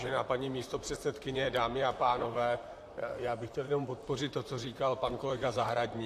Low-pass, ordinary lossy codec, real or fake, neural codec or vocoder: 14.4 kHz; AAC, 64 kbps; fake; vocoder, 44.1 kHz, 128 mel bands, Pupu-Vocoder